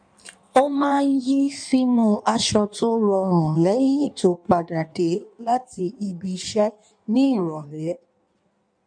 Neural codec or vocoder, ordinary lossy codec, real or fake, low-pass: codec, 16 kHz in and 24 kHz out, 1.1 kbps, FireRedTTS-2 codec; MP3, 96 kbps; fake; 9.9 kHz